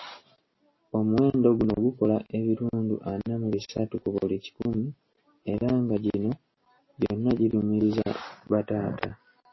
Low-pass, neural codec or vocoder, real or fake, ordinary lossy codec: 7.2 kHz; none; real; MP3, 24 kbps